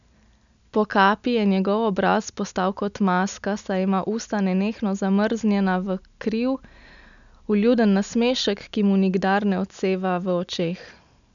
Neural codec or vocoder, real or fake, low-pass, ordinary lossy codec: none; real; 7.2 kHz; none